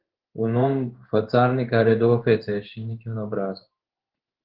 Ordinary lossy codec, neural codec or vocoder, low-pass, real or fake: Opus, 16 kbps; codec, 16 kHz in and 24 kHz out, 1 kbps, XY-Tokenizer; 5.4 kHz; fake